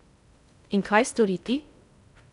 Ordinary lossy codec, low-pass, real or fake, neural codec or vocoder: none; 10.8 kHz; fake; codec, 16 kHz in and 24 kHz out, 0.6 kbps, FocalCodec, streaming, 2048 codes